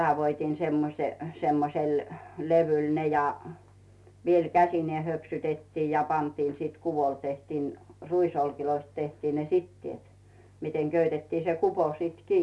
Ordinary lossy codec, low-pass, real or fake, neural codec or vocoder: none; none; real; none